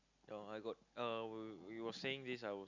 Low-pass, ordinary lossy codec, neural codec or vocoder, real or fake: 7.2 kHz; none; none; real